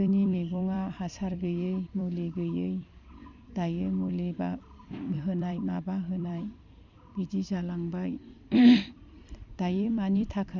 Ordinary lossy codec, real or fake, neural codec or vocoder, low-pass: none; fake; vocoder, 44.1 kHz, 128 mel bands every 256 samples, BigVGAN v2; 7.2 kHz